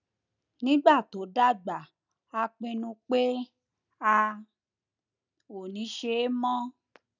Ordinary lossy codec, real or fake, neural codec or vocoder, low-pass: none; real; none; 7.2 kHz